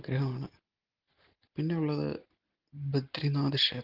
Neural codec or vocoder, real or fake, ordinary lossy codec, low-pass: none; real; Opus, 16 kbps; 5.4 kHz